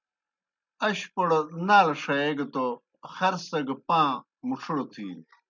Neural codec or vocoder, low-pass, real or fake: none; 7.2 kHz; real